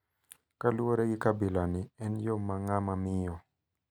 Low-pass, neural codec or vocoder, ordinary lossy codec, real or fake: 19.8 kHz; none; none; real